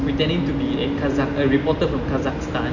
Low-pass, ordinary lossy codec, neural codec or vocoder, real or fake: 7.2 kHz; none; none; real